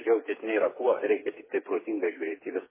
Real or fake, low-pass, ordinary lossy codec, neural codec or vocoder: fake; 3.6 kHz; MP3, 16 kbps; codec, 16 kHz, 4 kbps, FreqCodec, smaller model